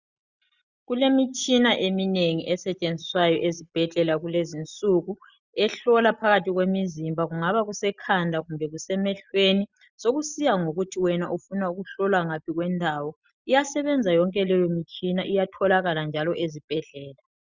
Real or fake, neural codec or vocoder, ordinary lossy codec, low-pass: real; none; Opus, 64 kbps; 7.2 kHz